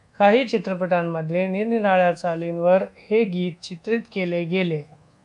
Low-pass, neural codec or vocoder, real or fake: 10.8 kHz; codec, 24 kHz, 1.2 kbps, DualCodec; fake